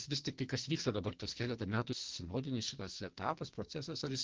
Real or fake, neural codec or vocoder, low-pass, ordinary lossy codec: fake; codec, 44.1 kHz, 2.6 kbps, SNAC; 7.2 kHz; Opus, 16 kbps